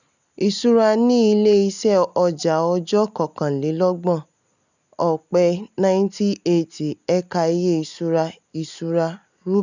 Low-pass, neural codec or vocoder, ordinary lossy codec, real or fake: 7.2 kHz; none; none; real